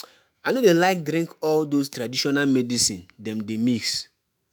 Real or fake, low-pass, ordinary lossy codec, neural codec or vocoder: fake; none; none; autoencoder, 48 kHz, 128 numbers a frame, DAC-VAE, trained on Japanese speech